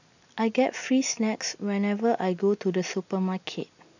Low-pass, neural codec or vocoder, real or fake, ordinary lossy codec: 7.2 kHz; none; real; none